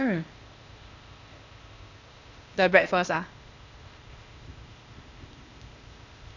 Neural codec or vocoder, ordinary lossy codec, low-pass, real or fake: codec, 16 kHz, 0.8 kbps, ZipCodec; Opus, 64 kbps; 7.2 kHz; fake